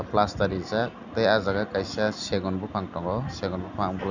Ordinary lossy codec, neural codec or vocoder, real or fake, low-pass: none; none; real; 7.2 kHz